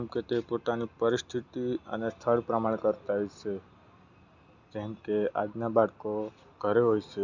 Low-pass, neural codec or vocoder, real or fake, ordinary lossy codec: 7.2 kHz; none; real; none